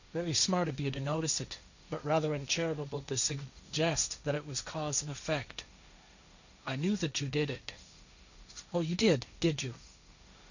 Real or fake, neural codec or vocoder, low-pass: fake; codec, 16 kHz, 1.1 kbps, Voila-Tokenizer; 7.2 kHz